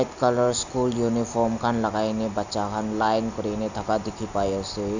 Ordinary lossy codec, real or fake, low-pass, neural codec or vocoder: none; real; 7.2 kHz; none